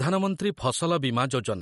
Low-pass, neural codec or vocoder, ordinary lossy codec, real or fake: 19.8 kHz; none; MP3, 48 kbps; real